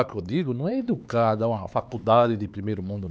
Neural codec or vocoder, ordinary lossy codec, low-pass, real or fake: codec, 16 kHz, 4 kbps, X-Codec, HuBERT features, trained on LibriSpeech; none; none; fake